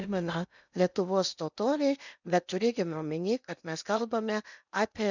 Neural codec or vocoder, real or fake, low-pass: codec, 16 kHz in and 24 kHz out, 0.6 kbps, FocalCodec, streaming, 2048 codes; fake; 7.2 kHz